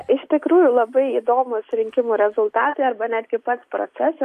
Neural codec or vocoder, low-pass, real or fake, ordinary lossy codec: none; 14.4 kHz; real; AAC, 64 kbps